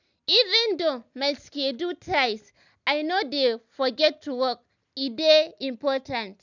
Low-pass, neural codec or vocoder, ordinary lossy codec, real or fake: 7.2 kHz; none; none; real